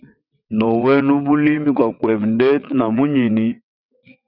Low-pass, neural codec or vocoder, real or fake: 5.4 kHz; vocoder, 22.05 kHz, 80 mel bands, WaveNeXt; fake